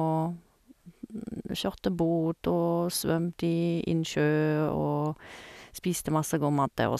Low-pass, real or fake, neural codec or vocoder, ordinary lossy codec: 14.4 kHz; real; none; none